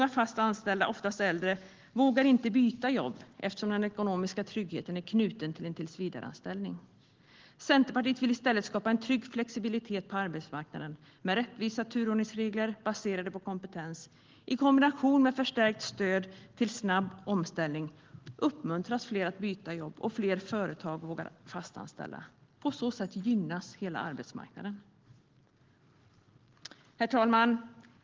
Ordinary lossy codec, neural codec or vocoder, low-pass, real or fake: Opus, 16 kbps; none; 7.2 kHz; real